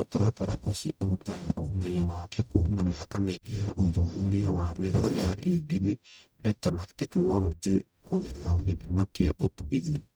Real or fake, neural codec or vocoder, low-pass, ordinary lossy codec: fake; codec, 44.1 kHz, 0.9 kbps, DAC; none; none